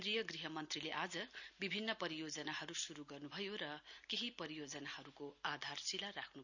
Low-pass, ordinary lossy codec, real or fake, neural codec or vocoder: 7.2 kHz; none; real; none